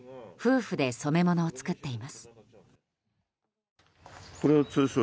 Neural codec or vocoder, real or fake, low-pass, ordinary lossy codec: none; real; none; none